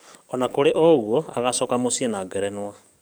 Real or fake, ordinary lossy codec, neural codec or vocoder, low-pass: fake; none; codec, 44.1 kHz, 7.8 kbps, DAC; none